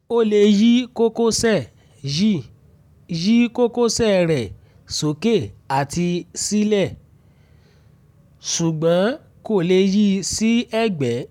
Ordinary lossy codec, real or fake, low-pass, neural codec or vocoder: none; real; 19.8 kHz; none